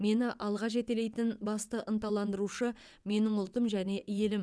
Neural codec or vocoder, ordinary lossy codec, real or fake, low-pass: vocoder, 22.05 kHz, 80 mel bands, WaveNeXt; none; fake; none